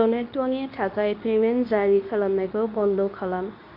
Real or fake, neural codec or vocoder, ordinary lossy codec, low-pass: fake; codec, 24 kHz, 0.9 kbps, WavTokenizer, medium speech release version 2; none; 5.4 kHz